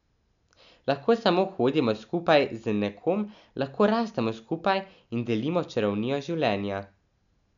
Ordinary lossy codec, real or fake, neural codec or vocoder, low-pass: none; real; none; 7.2 kHz